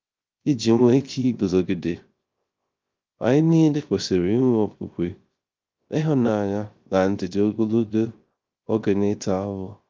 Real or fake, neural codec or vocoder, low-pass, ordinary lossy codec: fake; codec, 16 kHz, 0.3 kbps, FocalCodec; 7.2 kHz; Opus, 32 kbps